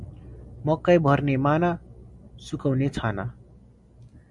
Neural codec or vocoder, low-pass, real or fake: none; 10.8 kHz; real